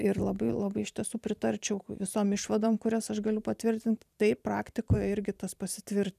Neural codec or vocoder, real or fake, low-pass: vocoder, 44.1 kHz, 128 mel bands every 512 samples, BigVGAN v2; fake; 14.4 kHz